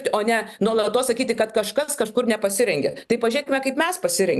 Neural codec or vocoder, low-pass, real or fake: vocoder, 44.1 kHz, 128 mel bands every 256 samples, BigVGAN v2; 14.4 kHz; fake